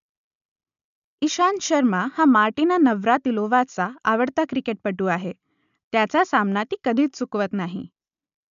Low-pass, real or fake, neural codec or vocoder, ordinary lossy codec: 7.2 kHz; real; none; none